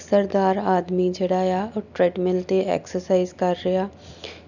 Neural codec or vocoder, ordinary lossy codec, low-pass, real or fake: none; none; 7.2 kHz; real